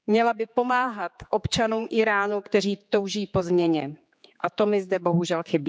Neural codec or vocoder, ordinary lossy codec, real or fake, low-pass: codec, 16 kHz, 4 kbps, X-Codec, HuBERT features, trained on general audio; none; fake; none